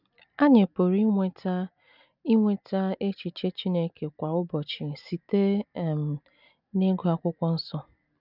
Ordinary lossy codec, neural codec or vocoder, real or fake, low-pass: none; none; real; 5.4 kHz